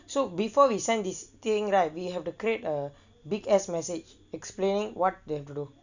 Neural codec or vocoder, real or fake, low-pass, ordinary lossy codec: none; real; 7.2 kHz; none